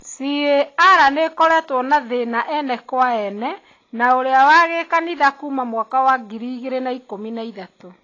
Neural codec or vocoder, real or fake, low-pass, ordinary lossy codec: none; real; 7.2 kHz; AAC, 32 kbps